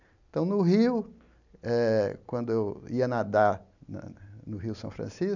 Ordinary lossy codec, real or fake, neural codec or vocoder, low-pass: none; real; none; 7.2 kHz